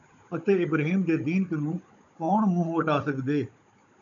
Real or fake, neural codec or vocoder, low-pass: fake; codec, 16 kHz, 16 kbps, FunCodec, trained on Chinese and English, 50 frames a second; 7.2 kHz